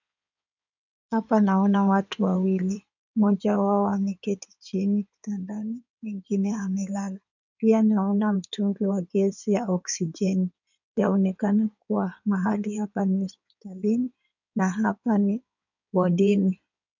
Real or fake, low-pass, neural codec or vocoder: fake; 7.2 kHz; codec, 16 kHz in and 24 kHz out, 2.2 kbps, FireRedTTS-2 codec